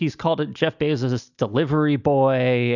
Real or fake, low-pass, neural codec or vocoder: real; 7.2 kHz; none